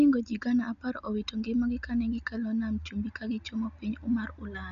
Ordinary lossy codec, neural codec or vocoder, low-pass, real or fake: none; none; 7.2 kHz; real